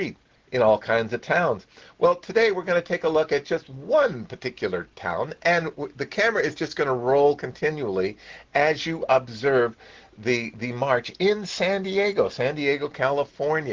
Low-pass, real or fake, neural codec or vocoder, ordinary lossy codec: 7.2 kHz; real; none; Opus, 16 kbps